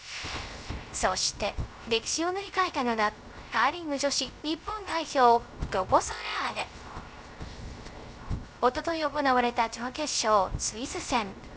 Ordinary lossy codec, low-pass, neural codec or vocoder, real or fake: none; none; codec, 16 kHz, 0.3 kbps, FocalCodec; fake